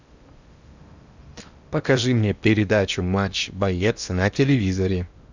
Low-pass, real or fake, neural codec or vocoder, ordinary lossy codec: 7.2 kHz; fake; codec, 16 kHz in and 24 kHz out, 0.8 kbps, FocalCodec, streaming, 65536 codes; Opus, 64 kbps